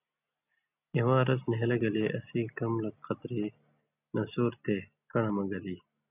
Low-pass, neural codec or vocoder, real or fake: 3.6 kHz; none; real